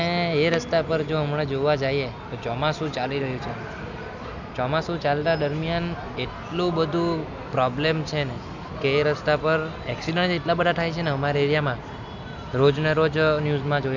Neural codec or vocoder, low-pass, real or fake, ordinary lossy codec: none; 7.2 kHz; real; none